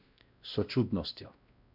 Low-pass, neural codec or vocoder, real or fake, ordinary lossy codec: 5.4 kHz; codec, 16 kHz, 1 kbps, X-Codec, WavLM features, trained on Multilingual LibriSpeech; fake; none